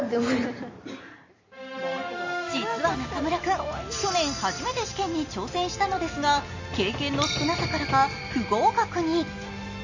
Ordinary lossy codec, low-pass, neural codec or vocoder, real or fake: MP3, 32 kbps; 7.2 kHz; none; real